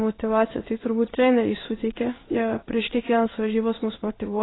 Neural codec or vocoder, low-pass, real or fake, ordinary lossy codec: codec, 16 kHz in and 24 kHz out, 1 kbps, XY-Tokenizer; 7.2 kHz; fake; AAC, 16 kbps